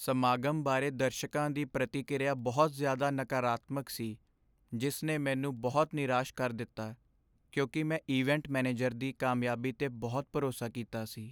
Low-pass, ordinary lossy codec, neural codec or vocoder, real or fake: none; none; none; real